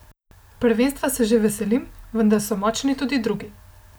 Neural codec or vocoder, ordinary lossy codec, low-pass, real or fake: none; none; none; real